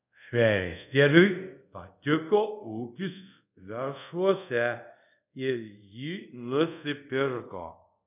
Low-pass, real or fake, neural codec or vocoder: 3.6 kHz; fake; codec, 24 kHz, 0.5 kbps, DualCodec